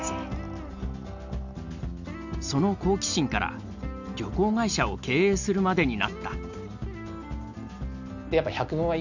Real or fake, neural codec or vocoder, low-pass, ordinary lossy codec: real; none; 7.2 kHz; none